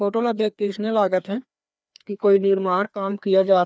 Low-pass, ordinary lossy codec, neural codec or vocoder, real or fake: none; none; codec, 16 kHz, 2 kbps, FreqCodec, larger model; fake